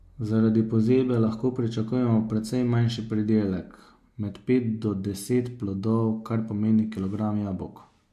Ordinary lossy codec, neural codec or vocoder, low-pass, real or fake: MP3, 64 kbps; none; 14.4 kHz; real